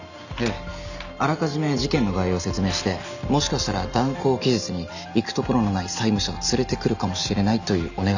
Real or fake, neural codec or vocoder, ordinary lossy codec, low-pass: real; none; none; 7.2 kHz